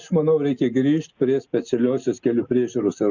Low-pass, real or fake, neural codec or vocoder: 7.2 kHz; real; none